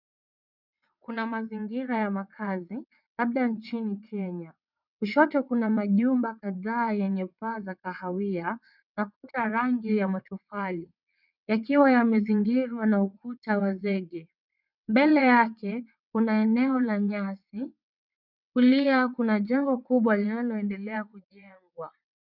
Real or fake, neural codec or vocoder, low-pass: fake; vocoder, 22.05 kHz, 80 mel bands, WaveNeXt; 5.4 kHz